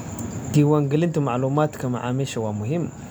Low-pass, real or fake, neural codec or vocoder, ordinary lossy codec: none; real; none; none